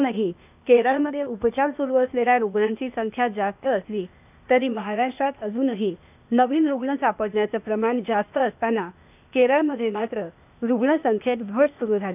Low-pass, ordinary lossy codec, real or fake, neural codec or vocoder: 3.6 kHz; none; fake; codec, 16 kHz, 0.8 kbps, ZipCodec